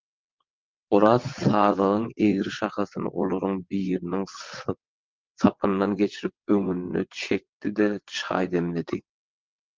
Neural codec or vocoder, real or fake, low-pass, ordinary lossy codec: vocoder, 22.05 kHz, 80 mel bands, WaveNeXt; fake; 7.2 kHz; Opus, 32 kbps